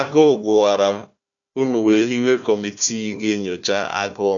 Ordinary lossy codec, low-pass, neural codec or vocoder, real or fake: none; 7.2 kHz; codec, 16 kHz, 1 kbps, FunCodec, trained on Chinese and English, 50 frames a second; fake